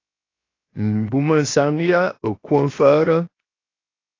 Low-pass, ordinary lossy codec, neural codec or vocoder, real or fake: 7.2 kHz; AAC, 32 kbps; codec, 16 kHz, 0.7 kbps, FocalCodec; fake